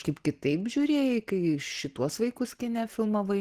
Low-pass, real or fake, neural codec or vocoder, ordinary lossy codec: 14.4 kHz; real; none; Opus, 16 kbps